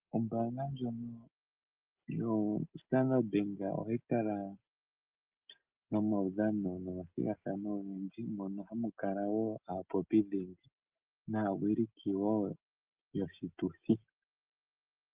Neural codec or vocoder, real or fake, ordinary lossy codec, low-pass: none; real; Opus, 24 kbps; 3.6 kHz